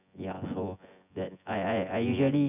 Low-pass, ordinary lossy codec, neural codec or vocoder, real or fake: 3.6 kHz; none; vocoder, 24 kHz, 100 mel bands, Vocos; fake